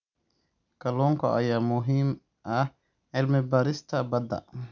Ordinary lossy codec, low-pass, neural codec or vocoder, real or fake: none; 7.2 kHz; none; real